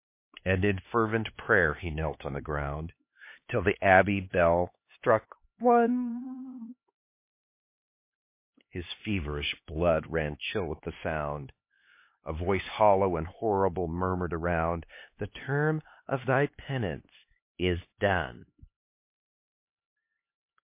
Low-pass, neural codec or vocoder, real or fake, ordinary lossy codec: 3.6 kHz; codec, 16 kHz, 4 kbps, X-Codec, HuBERT features, trained on LibriSpeech; fake; MP3, 24 kbps